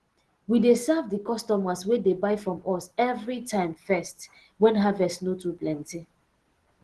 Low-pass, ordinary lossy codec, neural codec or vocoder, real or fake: 10.8 kHz; Opus, 16 kbps; none; real